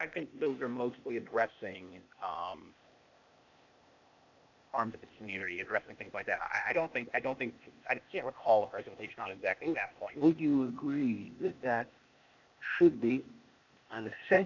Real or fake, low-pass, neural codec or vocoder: fake; 7.2 kHz; codec, 16 kHz, 0.8 kbps, ZipCodec